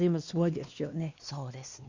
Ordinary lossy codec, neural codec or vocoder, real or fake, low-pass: Opus, 64 kbps; codec, 16 kHz, 2 kbps, X-Codec, WavLM features, trained on Multilingual LibriSpeech; fake; 7.2 kHz